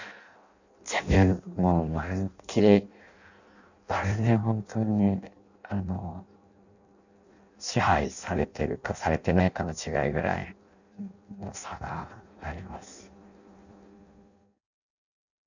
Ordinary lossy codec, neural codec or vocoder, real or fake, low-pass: none; codec, 16 kHz in and 24 kHz out, 0.6 kbps, FireRedTTS-2 codec; fake; 7.2 kHz